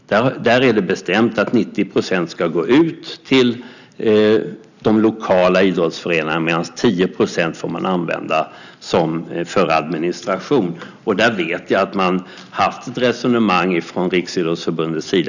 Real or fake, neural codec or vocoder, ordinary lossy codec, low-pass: real; none; none; 7.2 kHz